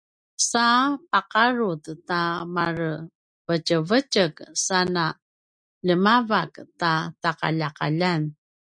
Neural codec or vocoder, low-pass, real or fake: none; 9.9 kHz; real